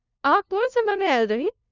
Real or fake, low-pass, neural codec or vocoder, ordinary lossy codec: fake; 7.2 kHz; codec, 16 kHz, 0.5 kbps, FunCodec, trained on LibriTTS, 25 frames a second; none